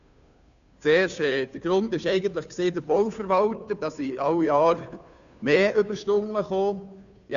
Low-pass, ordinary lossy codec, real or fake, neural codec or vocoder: 7.2 kHz; none; fake; codec, 16 kHz, 2 kbps, FunCodec, trained on Chinese and English, 25 frames a second